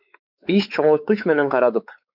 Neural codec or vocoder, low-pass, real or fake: codec, 16 kHz, 4 kbps, X-Codec, WavLM features, trained on Multilingual LibriSpeech; 5.4 kHz; fake